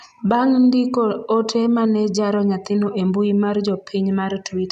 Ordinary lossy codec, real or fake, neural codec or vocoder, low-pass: none; real; none; 14.4 kHz